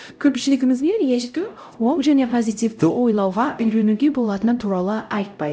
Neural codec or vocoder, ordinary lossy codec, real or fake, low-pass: codec, 16 kHz, 0.5 kbps, X-Codec, HuBERT features, trained on LibriSpeech; none; fake; none